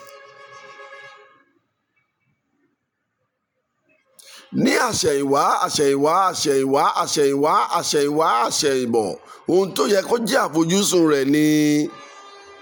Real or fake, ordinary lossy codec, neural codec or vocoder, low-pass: real; none; none; none